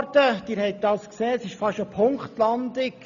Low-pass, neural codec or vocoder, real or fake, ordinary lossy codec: 7.2 kHz; none; real; none